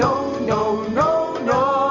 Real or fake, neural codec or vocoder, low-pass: real; none; 7.2 kHz